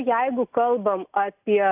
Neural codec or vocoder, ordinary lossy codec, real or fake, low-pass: none; AAC, 32 kbps; real; 3.6 kHz